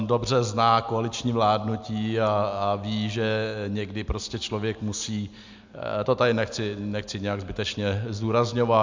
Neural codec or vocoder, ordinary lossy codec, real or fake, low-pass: none; MP3, 64 kbps; real; 7.2 kHz